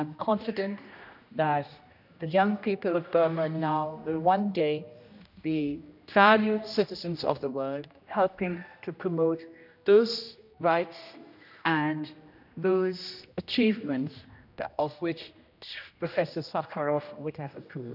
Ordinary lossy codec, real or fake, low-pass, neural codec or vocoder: none; fake; 5.4 kHz; codec, 16 kHz, 1 kbps, X-Codec, HuBERT features, trained on general audio